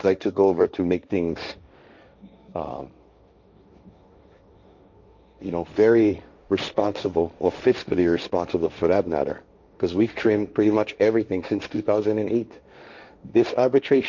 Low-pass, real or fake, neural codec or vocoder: 7.2 kHz; fake; codec, 16 kHz, 1.1 kbps, Voila-Tokenizer